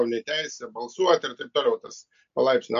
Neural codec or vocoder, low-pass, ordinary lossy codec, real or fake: none; 7.2 kHz; MP3, 48 kbps; real